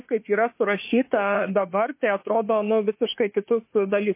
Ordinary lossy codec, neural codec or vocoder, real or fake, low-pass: MP3, 24 kbps; autoencoder, 48 kHz, 32 numbers a frame, DAC-VAE, trained on Japanese speech; fake; 3.6 kHz